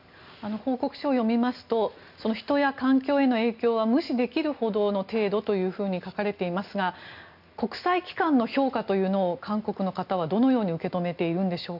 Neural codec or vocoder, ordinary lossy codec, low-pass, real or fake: none; none; 5.4 kHz; real